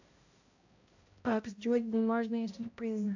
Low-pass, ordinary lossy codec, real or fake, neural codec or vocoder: 7.2 kHz; AAC, 48 kbps; fake; codec, 16 kHz, 0.5 kbps, X-Codec, HuBERT features, trained on balanced general audio